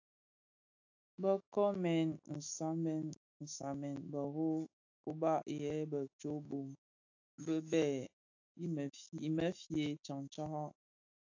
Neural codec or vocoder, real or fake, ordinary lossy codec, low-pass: autoencoder, 48 kHz, 128 numbers a frame, DAC-VAE, trained on Japanese speech; fake; AAC, 48 kbps; 7.2 kHz